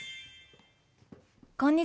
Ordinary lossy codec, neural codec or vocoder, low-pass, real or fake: none; none; none; real